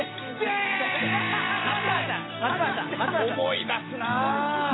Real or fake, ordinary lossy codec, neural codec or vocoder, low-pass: real; AAC, 16 kbps; none; 7.2 kHz